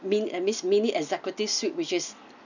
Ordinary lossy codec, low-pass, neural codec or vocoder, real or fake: none; 7.2 kHz; none; real